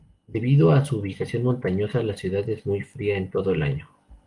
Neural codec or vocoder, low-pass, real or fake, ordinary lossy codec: none; 10.8 kHz; real; Opus, 24 kbps